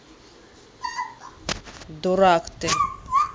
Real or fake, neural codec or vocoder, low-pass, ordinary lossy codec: real; none; none; none